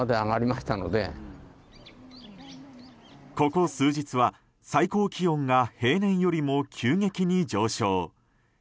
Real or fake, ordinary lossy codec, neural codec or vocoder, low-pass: real; none; none; none